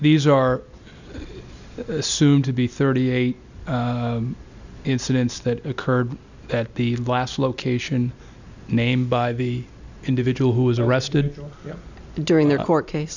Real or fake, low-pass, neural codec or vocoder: real; 7.2 kHz; none